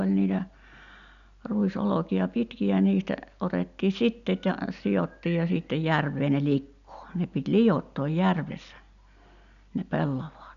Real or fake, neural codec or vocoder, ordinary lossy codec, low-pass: real; none; none; 7.2 kHz